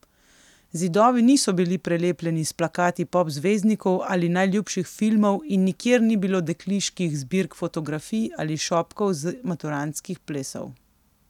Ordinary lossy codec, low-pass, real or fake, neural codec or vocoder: none; 19.8 kHz; real; none